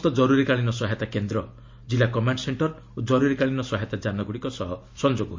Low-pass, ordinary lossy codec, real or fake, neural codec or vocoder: 7.2 kHz; MP3, 48 kbps; real; none